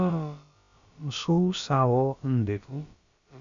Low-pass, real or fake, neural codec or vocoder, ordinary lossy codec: 7.2 kHz; fake; codec, 16 kHz, about 1 kbps, DyCAST, with the encoder's durations; Opus, 64 kbps